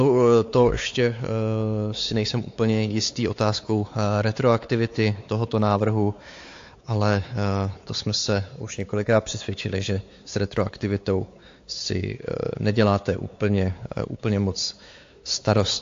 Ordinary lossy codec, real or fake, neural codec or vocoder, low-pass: AAC, 48 kbps; fake; codec, 16 kHz, 4 kbps, X-Codec, WavLM features, trained on Multilingual LibriSpeech; 7.2 kHz